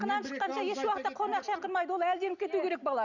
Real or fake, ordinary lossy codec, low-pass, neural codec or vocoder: real; none; 7.2 kHz; none